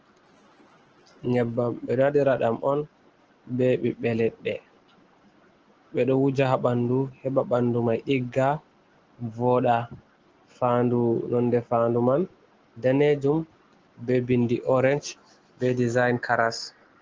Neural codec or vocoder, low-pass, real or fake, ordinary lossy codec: none; 7.2 kHz; real; Opus, 16 kbps